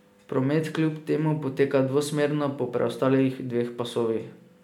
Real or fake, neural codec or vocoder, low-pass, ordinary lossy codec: real; none; 19.8 kHz; MP3, 96 kbps